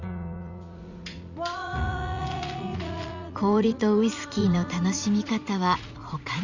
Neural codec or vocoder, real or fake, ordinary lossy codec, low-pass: vocoder, 44.1 kHz, 80 mel bands, Vocos; fake; Opus, 64 kbps; 7.2 kHz